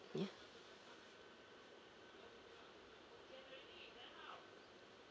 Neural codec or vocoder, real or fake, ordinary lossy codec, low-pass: none; real; none; none